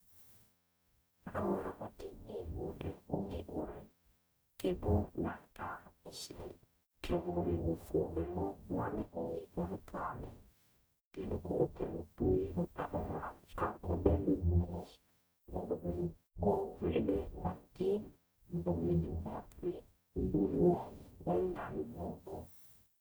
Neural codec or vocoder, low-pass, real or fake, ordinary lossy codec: codec, 44.1 kHz, 0.9 kbps, DAC; none; fake; none